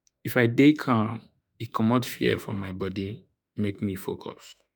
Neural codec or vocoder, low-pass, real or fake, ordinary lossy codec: autoencoder, 48 kHz, 32 numbers a frame, DAC-VAE, trained on Japanese speech; none; fake; none